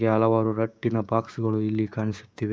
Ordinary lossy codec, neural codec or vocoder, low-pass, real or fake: none; codec, 16 kHz, 6 kbps, DAC; none; fake